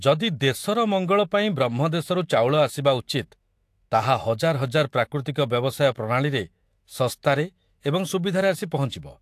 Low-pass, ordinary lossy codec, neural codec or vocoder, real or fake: 14.4 kHz; AAC, 64 kbps; autoencoder, 48 kHz, 128 numbers a frame, DAC-VAE, trained on Japanese speech; fake